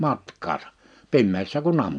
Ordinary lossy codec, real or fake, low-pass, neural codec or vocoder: none; real; 9.9 kHz; none